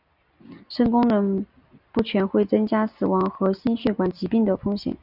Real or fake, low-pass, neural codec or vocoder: real; 5.4 kHz; none